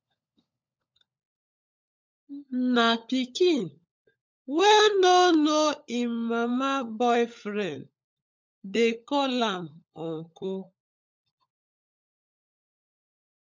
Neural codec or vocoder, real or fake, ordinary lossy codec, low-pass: codec, 16 kHz, 16 kbps, FunCodec, trained on LibriTTS, 50 frames a second; fake; MP3, 64 kbps; 7.2 kHz